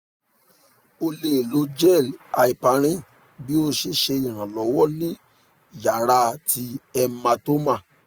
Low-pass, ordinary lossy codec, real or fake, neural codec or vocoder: none; none; real; none